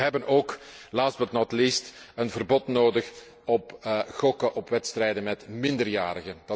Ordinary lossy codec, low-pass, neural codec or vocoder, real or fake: none; none; none; real